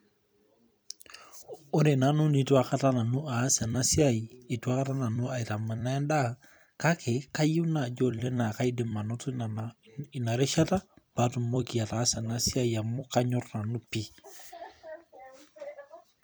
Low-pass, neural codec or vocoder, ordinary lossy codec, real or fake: none; vocoder, 44.1 kHz, 128 mel bands every 256 samples, BigVGAN v2; none; fake